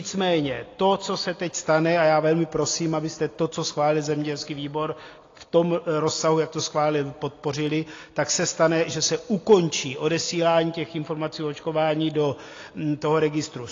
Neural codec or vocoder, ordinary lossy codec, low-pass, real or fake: none; AAC, 32 kbps; 7.2 kHz; real